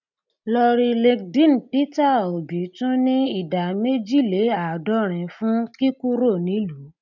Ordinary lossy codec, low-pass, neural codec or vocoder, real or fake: none; 7.2 kHz; none; real